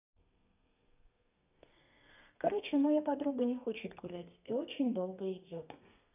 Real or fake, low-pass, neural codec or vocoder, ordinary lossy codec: fake; 3.6 kHz; codec, 32 kHz, 1.9 kbps, SNAC; none